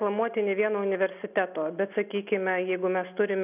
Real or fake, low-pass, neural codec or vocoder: real; 3.6 kHz; none